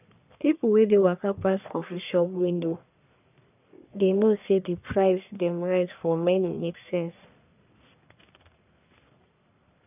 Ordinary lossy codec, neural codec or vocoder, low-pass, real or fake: none; codec, 44.1 kHz, 1.7 kbps, Pupu-Codec; 3.6 kHz; fake